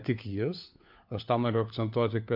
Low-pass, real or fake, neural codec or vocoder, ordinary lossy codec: 5.4 kHz; fake; codec, 16 kHz, 4 kbps, FunCodec, trained on LibriTTS, 50 frames a second; MP3, 48 kbps